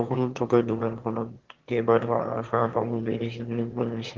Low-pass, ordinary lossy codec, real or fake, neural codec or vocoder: 7.2 kHz; Opus, 16 kbps; fake; autoencoder, 22.05 kHz, a latent of 192 numbers a frame, VITS, trained on one speaker